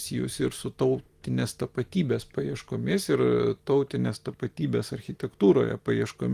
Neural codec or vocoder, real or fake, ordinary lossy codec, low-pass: none; real; Opus, 24 kbps; 14.4 kHz